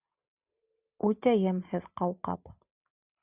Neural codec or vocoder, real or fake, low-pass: none; real; 3.6 kHz